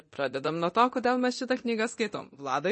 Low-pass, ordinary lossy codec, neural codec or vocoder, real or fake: 10.8 kHz; MP3, 32 kbps; codec, 24 kHz, 0.9 kbps, DualCodec; fake